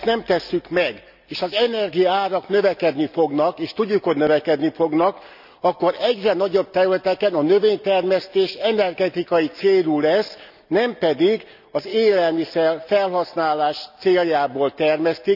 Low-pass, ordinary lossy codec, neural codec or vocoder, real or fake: 5.4 kHz; none; none; real